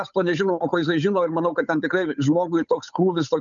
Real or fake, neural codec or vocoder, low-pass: fake; codec, 16 kHz, 8 kbps, FunCodec, trained on Chinese and English, 25 frames a second; 7.2 kHz